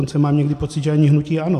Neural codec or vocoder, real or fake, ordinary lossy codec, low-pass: none; real; AAC, 64 kbps; 14.4 kHz